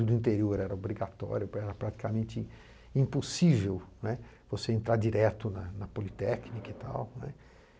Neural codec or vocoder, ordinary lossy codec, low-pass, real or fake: none; none; none; real